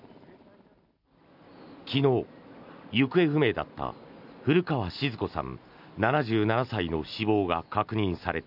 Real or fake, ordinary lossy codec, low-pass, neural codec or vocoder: real; none; 5.4 kHz; none